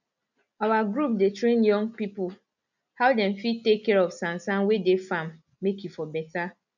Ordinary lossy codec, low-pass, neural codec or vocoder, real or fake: none; 7.2 kHz; none; real